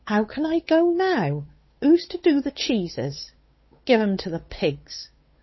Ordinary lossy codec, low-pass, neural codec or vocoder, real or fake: MP3, 24 kbps; 7.2 kHz; codec, 16 kHz, 8 kbps, FunCodec, trained on Chinese and English, 25 frames a second; fake